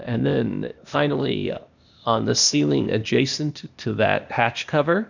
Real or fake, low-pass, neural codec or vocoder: fake; 7.2 kHz; codec, 16 kHz, 0.8 kbps, ZipCodec